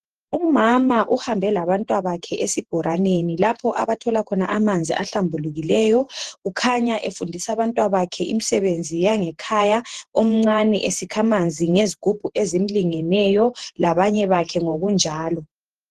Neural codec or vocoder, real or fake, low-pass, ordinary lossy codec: vocoder, 48 kHz, 128 mel bands, Vocos; fake; 14.4 kHz; Opus, 16 kbps